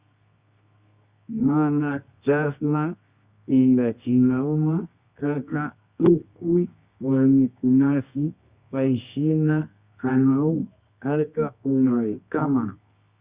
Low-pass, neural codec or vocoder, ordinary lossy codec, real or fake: 3.6 kHz; codec, 24 kHz, 0.9 kbps, WavTokenizer, medium music audio release; Opus, 64 kbps; fake